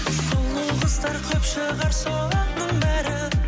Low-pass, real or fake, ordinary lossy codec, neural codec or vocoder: none; real; none; none